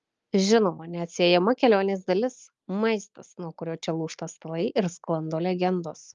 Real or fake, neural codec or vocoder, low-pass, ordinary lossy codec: real; none; 7.2 kHz; Opus, 32 kbps